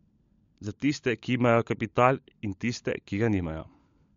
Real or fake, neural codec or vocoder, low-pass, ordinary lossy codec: fake; codec, 16 kHz, 16 kbps, FunCodec, trained on LibriTTS, 50 frames a second; 7.2 kHz; MP3, 48 kbps